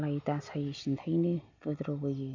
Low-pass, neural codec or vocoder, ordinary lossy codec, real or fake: 7.2 kHz; none; MP3, 64 kbps; real